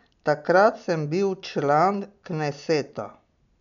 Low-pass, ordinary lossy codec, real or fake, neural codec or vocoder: 7.2 kHz; none; real; none